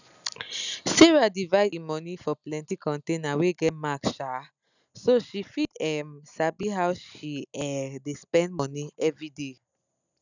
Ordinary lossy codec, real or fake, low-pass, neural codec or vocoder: none; real; 7.2 kHz; none